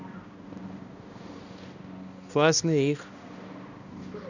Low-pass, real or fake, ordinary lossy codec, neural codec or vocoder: 7.2 kHz; fake; none; codec, 16 kHz, 1 kbps, X-Codec, HuBERT features, trained on balanced general audio